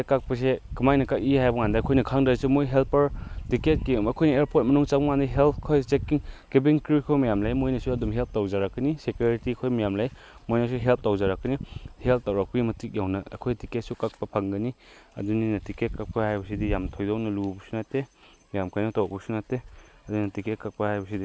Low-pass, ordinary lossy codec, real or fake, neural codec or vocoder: none; none; real; none